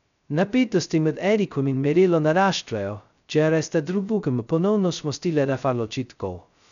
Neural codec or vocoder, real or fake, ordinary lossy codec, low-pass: codec, 16 kHz, 0.2 kbps, FocalCodec; fake; none; 7.2 kHz